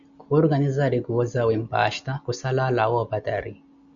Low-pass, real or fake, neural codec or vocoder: 7.2 kHz; real; none